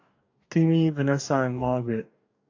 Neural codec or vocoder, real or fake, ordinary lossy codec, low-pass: codec, 44.1 kHz, 2.6 kbps, DAC; fake; AAC, 48 kbps; 7.2 kHz